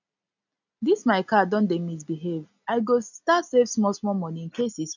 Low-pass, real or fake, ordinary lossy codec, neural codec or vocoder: 7.2 kHz; real; none; none